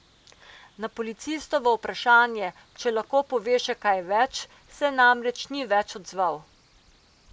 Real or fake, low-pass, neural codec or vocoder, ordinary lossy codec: real; none; none; none